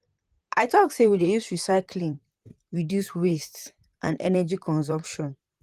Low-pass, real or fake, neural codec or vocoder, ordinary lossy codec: 14.4 kHz; fake; vocoder, 44.1 kHz, 128 mel bands, Pupu-Vocoder; Opus, 24 kbps